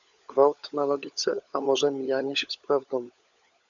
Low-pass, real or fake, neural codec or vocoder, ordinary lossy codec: 7.2 kHz; fake; codec, 16 kHz, 16 kbps, FunCodec, trained on LibriTTS, 50 frames a second; MP3, 96 kbps